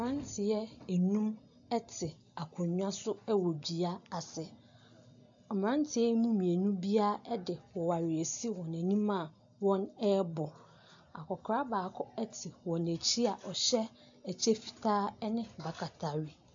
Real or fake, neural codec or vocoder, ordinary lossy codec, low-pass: real; none; AAC, 64 kbps; 7.2 kHz